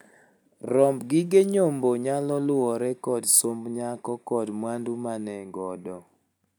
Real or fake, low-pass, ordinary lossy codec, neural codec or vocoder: real; none; none; none